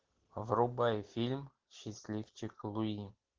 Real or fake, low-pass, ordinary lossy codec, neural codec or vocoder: real; 7.2 kHz; Opus, 16 kbps; none